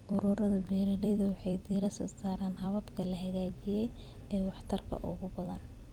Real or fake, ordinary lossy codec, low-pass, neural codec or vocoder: fake; Opus, 32 kbps; 19.8 kHz; vocoder, 44.1 kHz, 128 mel bands every 256 samples, BigVGAN v2